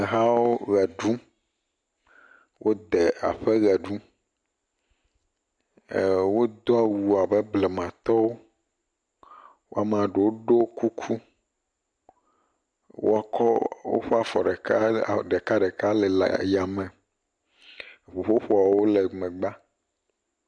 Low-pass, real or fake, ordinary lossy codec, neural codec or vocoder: 9.9 kHz; real; MP3, 96 kbps; none